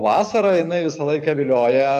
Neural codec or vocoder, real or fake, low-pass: codec, 44.1 kHz, 7.8 kbps, DAC; fake; 14.4 kHz